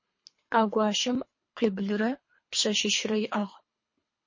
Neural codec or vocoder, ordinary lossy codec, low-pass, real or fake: codec, 24 kHz, 3 kbps, HILCodec; MP3, 32 kbps; 7.2 kHz; fake